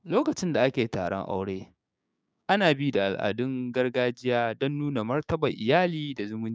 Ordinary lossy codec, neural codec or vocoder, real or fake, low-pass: none; codec, 16 kHz, 6 kbps, DAC; fake; none